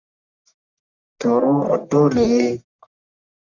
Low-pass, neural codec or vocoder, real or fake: 7.2 kHz; codec, 44.1 kHz, 1.7 kbps, Pupu-Codec; fake